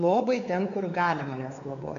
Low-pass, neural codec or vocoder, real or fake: 7.2 kHz; codec, 16 kHz, 4 kbps, X-Codec, WavLM features, trained on Multilingual LibriSpeech; fake